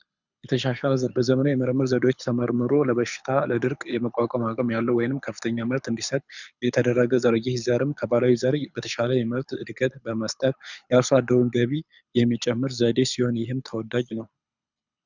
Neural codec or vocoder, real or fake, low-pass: codec, 24 kHz, 6 kbps, HILCodec; fake; 7.2 kHz